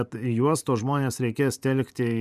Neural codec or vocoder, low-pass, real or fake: vocoder, 44.1 kHz, 128 mel bands every 512 samples, BigVGAN v2; 14.4 kHz; fake